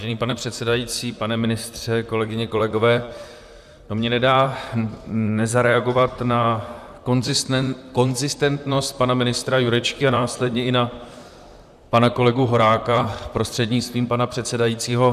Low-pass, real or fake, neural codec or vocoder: 14.4 kHz; fake; vocoder, 44.1 kHz, 128 mel bands, Pupu-Vocoder